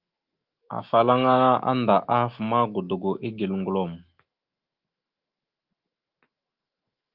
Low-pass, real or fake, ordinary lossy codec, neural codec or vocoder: 5.4 kHz; fake; Opus, 24 kbps; autoencoder, 48 kHz, 128 numbers a frame, DAC-VAE, trained on Japanese speech